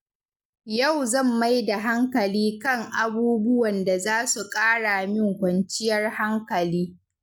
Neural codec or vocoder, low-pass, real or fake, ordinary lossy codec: none; 19.8 kHz; real; none